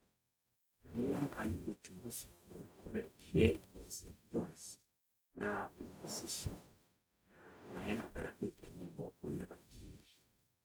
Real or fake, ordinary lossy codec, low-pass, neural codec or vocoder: fake; none; none; codec, 44.1 kHz, 0.9 kbps, DAC